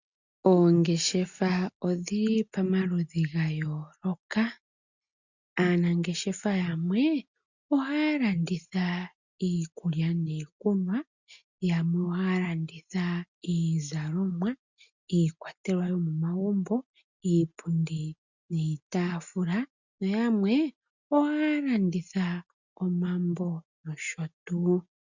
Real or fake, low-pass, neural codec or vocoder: real; 7.2 kHz; none